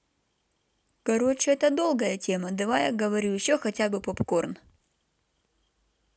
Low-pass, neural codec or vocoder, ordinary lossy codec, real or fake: none; none; none; real